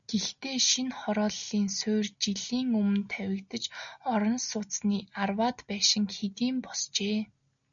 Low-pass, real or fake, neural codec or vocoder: 7.2 kHz; real; none